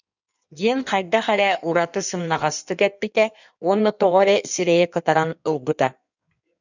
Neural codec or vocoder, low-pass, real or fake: codec, 16 kHz in and 24 kHz out, 1.1 kbps, FireRedTTS-2 codec; 7.2 kHz; fake